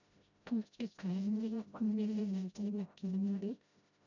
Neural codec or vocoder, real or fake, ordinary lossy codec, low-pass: codec, 16 kHz, 0.5 kbps, FreqCodec, smaller model; fake; none; 7.2 kHz